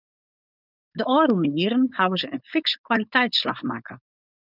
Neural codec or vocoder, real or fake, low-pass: codec, 16 kHz, 4.8 kbps, FACodec; fake; 5.4 kHz